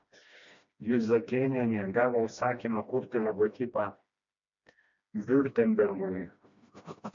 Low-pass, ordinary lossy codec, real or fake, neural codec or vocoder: 7.2 kHz; MP3, 48 kbps; fake; codec, 16 kHz, 1 kbps, FreqCodec, smaller model